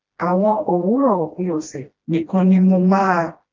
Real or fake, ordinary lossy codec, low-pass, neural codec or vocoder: fake; Opus, 32 kbps; 7.2 kHz; codec, 16 kHz, 1 kbps, FreqCodec, smaller model